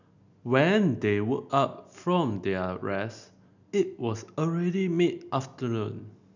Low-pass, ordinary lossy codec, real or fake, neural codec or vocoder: 7.2 kHz; none; real; none